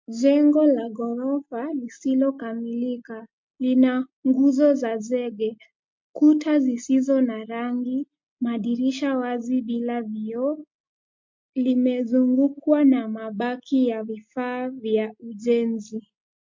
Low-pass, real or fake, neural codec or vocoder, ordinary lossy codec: 7.2 kHz; real; none; MP3, 48 kbps